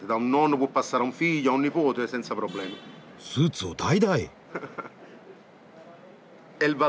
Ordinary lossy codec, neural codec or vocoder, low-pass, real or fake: none; none; none; real